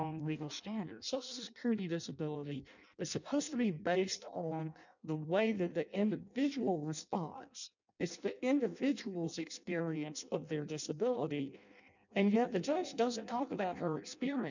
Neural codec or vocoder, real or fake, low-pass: codec, 16 kHz in and 24 kHz out, 0.6 kbps, FireRedTTS-2 codec; fake; 7.2 kHz